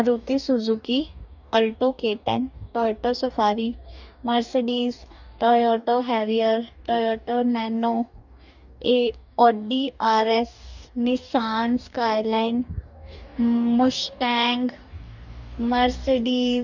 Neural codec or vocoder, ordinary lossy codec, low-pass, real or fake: codec, 44.1 kHz, 2.6 kbps, DAC; none; 7.2 kHz; fake